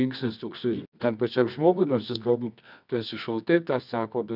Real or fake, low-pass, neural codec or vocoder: fake; 5.4 kHz; codec, 24 kHz, 0.9 kbps, WavTokenizer, medium music audio release